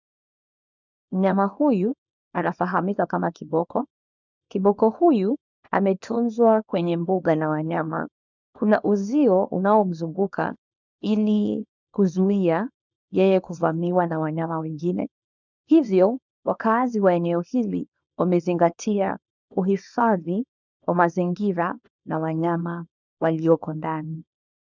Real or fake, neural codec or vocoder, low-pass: fake; codec, 24 kHz, 0.9 kbps, WavTokenizer, small release; 7.2 kHz